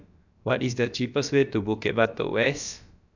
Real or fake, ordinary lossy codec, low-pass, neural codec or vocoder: fake; none; 7.2 kHz; codec, 16 kHz, about 1 kbps, DyCAST, with the encoder's durations